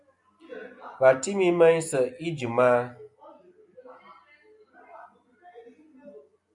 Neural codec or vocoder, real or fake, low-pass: none; real; 10.8 kHz